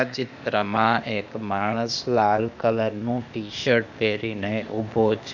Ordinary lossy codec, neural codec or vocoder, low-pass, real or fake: none; codec, 16 kHz, 0.8 kbps, ZipCodec; 7.2 kHz; fake